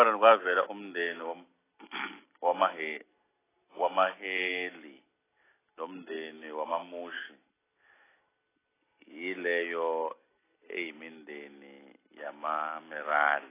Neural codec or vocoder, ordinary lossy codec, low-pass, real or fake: none; AAC, 16 kbps; 3.6 kHz; real